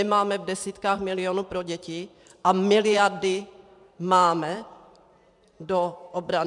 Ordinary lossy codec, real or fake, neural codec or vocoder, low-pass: MP3, 96 kbps; fake; vocoder, 44.1 kHz, 128 mel bands every 512 samples, BigVGAN v2; 10.8 kHz